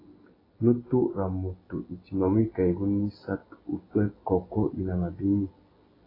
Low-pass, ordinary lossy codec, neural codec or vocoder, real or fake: 5.4 kHz; AAC, 24 kbps; none; real